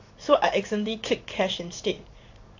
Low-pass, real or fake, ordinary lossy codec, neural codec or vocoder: 7.2 kHz; fake; AAC, 48 kbps; codec, 16 kHz in and 24 kHz out, 1 kbps, XY-Tokenizer